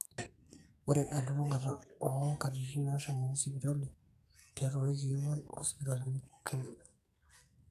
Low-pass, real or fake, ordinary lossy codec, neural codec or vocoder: 14.4 kHz; fake; none; codec, 44.1 kHz, 2.6 kbps, SNAC